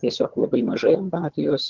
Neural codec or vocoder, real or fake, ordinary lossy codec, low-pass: vocoder, 22.05 kHz, 80 mel bands, HiFi-GAN; fake; Opus, 16 kbps; 7.2 kHz